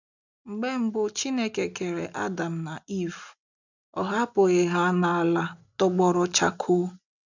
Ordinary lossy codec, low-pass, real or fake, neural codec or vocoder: none; 7.2 kHz; real; none